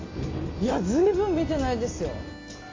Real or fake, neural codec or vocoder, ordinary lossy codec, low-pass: real; none; AAC, 32 kbps; 7.2 kHz